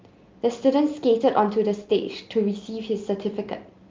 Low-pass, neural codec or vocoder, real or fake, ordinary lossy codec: 7.2 kHz; none; real; Opus, 24 kbps